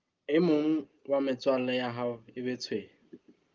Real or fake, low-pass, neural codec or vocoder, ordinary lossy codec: real; 7.2 kHz; none; Opus, 24 kbps